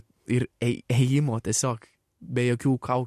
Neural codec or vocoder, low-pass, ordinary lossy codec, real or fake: none; 14.4 kHz; MP3, 64 kbps; real